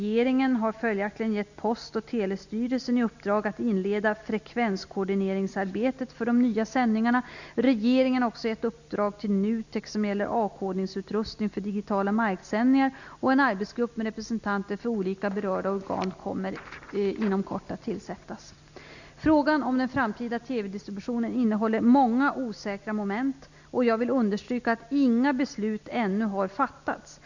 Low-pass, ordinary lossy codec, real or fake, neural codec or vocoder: 7.2 kHz; none; real; none